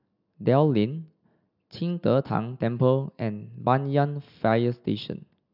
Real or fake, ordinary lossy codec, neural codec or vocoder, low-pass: real; none; none; 5.4 kHz